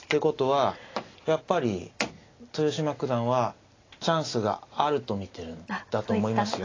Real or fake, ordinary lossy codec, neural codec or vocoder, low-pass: real; AAC, 32 kbps; none; 7.2 kHz